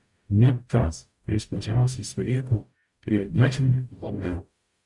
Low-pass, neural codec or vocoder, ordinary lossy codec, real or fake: 10.8 kHz; codec, 44.1 kHz, 0.9 kbps, DAC; Opus, 64 kbps; fake